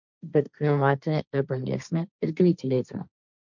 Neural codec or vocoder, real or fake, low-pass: codec, 16 kHz, 1.1 kbps, Voila-Tokenizer; fake; 7.2 kHz